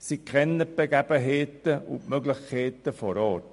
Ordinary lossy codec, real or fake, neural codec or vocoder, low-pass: MP3, 48 kbps; real; none; 14.4 kHz